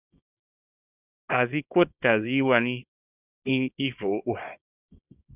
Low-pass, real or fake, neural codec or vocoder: 3.6 kHz; fake; codec, 24 kHz, 0.9 kbps, WavTokenizer, small release